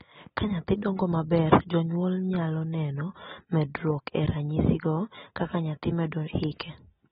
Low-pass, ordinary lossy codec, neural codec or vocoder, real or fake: 19.8 kHz; AAC, 16 kbps; none; real